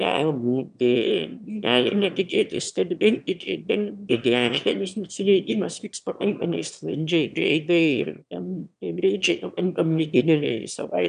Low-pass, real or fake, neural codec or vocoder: 9.9 kHz; fake; autoencoder, 22.05 kHz, a latent of 192 numbers a frame, VITS, trained on one speaker